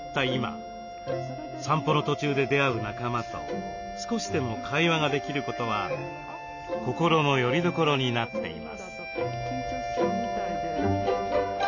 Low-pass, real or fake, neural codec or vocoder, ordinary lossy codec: 7.2 kHz; real; none; none